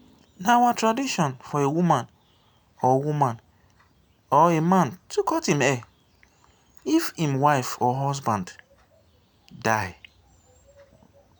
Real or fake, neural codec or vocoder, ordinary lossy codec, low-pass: real; none; none; none